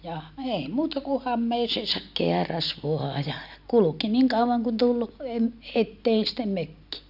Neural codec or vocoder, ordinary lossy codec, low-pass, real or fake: none; none; 5.4 kHz; real